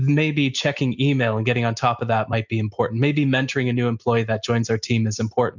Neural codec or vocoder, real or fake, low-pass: none; real; 7.2 kHz